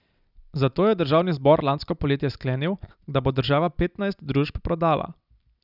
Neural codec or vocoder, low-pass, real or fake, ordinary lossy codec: none; 5.4 kHz; real; none